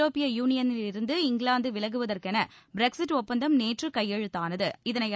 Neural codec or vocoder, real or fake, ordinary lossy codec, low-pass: none; real; none; none